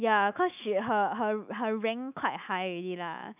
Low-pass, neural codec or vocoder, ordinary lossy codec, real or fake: 3.6 kHz; autoencoder, 48 kHz, 128 numbers a frame, DAC-VAE, trained on Japanese speech; none; fake